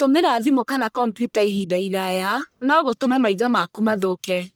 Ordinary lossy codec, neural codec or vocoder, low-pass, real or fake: none; codec, 44.1 kHz, 1.7 kbps, Pupu-Codec; none; fake